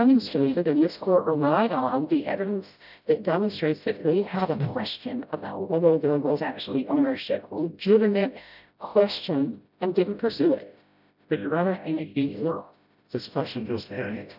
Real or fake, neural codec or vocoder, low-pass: fake; codec, 16 kHz, 0.5 kbps, FreqCodec, smaller model; 5.4 kHz